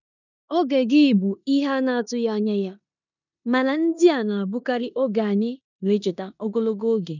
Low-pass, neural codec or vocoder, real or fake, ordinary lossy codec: 7.2 kHz; codec, 16 kHz in and 24 kHz out, 0.9 kbps, LongCat-Audio-Codec, four codebook decoder; fake; none